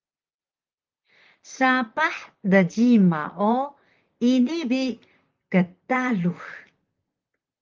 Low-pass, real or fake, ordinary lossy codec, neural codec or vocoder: 7.2 kHz; fake; Opus, 32 kbps; vocoder, 44.1 kHz, 128 mel bands, Pupu-Vocoder